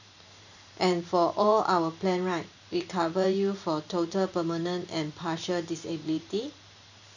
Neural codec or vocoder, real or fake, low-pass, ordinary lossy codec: vocoder, 44.1 kHz, 128 mel bands every 512 samples, BigVGAN v2; fake; 7.2 kHz; none